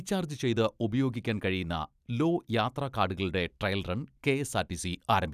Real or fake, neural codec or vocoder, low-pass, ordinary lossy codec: real; none; 14.4 kHz; none